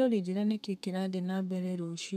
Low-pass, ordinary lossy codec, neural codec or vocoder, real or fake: 14.4 kHz; none; codec, 32 kHz, 1.9 kbps, SNAC; fake